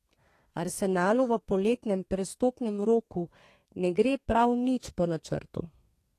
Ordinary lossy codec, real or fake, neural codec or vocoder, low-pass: AAC, 48 kbps; fake; codec, 32 kHz, 1.9 kbps, SNAC; 14.4 kHz